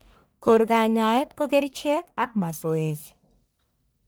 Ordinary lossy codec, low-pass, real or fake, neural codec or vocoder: none; none; fake; codec, 44.1 kHz, 1.7 kbps, Pupu-Codec